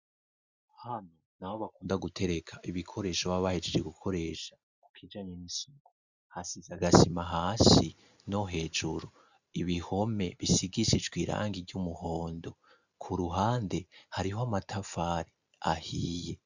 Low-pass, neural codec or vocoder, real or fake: 7.2 kHz; none; real